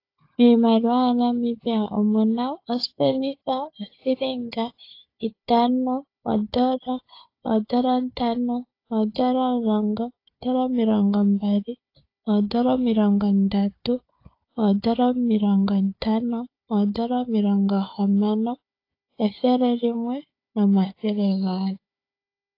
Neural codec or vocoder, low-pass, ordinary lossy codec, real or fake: codec, 16 kHz, 4 kbps, FunCodec, trained on Chinese and English, 50 frames a second; 5.4 kHz; AAC, 32 kbps; fake